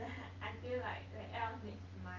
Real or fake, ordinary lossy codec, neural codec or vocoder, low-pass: real; Opus, 32 kbps; none; 7.2 kHz